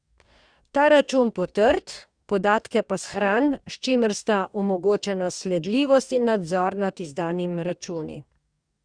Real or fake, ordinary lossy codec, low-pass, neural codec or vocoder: fake; Opus, 64 kbps; 9.9 kHz; codec, 44.1 kHz, 2.6 kbps, DAC